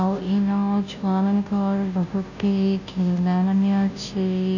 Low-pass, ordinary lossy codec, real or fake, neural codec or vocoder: 7.2 kHz; none; fake; codec, 16 kHz, 0.5 kbps, FunCodec, trained on Chinese and English, 25 frames a second